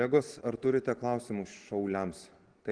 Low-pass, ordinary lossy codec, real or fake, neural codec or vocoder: 9.9 kHz; Opus, 16 kbps; real; none